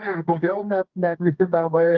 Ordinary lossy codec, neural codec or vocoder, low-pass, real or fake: Opus, 32 kbps; codec, 24 kHz, 0.9 kbps, WavTokenizer, medium music audio release; 7.2 kHz; fake